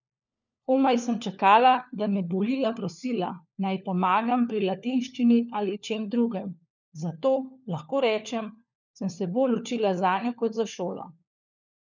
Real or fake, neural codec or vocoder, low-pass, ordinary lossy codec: fake; codec, 16 kHz, 4 kbps, FunCodec, trained on LibriTTS, 50 frames a second; 7.2 kHz; none